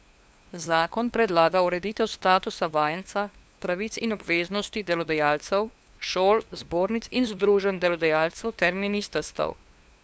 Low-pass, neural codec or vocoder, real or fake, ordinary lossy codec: none; codec, 16 kHz, 2 kbps, FunCodec, trained on LibriTTS, 25 frames a second; fake; none